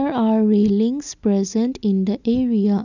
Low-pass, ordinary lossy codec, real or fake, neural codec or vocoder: 7.2 kHz; none; real; none